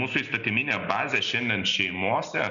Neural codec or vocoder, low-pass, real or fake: none; 7.2 kHz; real